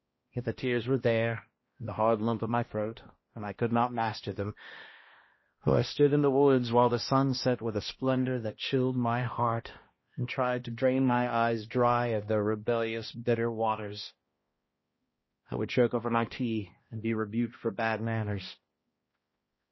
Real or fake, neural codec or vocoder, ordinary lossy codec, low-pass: fake; codec, 16 kHz, 1 kbps, X-Codec, HuBERT features, trained on balanced general audio; MP3, 24 kbps; 7.2 kHz